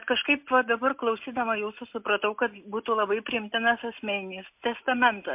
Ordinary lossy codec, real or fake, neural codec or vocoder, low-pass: MP3, 32 kbps; real; none; 3.6 kHz